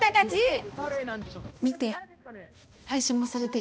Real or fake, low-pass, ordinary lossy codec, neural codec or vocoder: fake; none; none; codec, 16 kHz, 1 kbps, X-Codec, HuBERT features, trained on balanced general audio